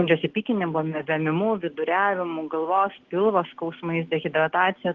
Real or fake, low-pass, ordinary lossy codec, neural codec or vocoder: real; 7.2 kHz; Opus, 16 kbps; none